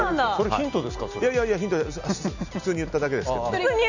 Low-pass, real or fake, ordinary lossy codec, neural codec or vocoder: 7.2 kHz; real; none; none